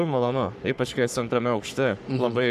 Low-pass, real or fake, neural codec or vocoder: 14.4 kHz; fake; codec, 44.1 kHz, 3.4 kbps, Pupu-Codec